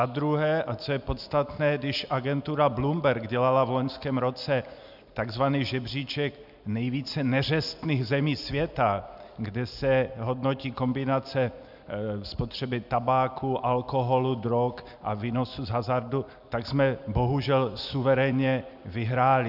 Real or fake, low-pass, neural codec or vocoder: real; 5.4 kHz; none